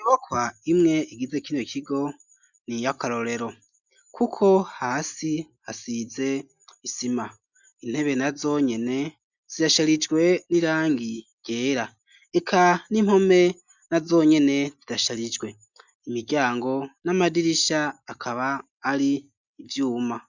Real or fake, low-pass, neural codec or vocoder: real; 7.2 kHz; none